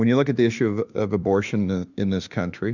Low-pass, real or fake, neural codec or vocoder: 7.2 kHz; fake; autoencoder, 48 kHz, 32 numbers a frame, DAC-VAE, trained on Japanese speech